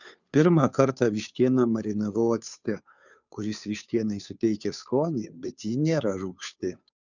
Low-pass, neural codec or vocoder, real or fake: 7.2 kHz; codec, 16 kHz, 2 kbps, FunCodec, trained on Chinese and English, 25 frames a second; fake